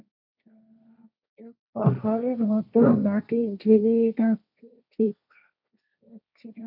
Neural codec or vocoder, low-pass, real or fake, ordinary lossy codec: codec, 16 kHz, 1.1 kbps, Voila-Tokenizer; 5.4 kHz; fake; none